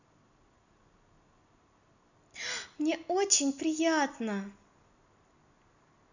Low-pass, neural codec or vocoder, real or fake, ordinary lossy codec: 7.2 kHz; none; real; none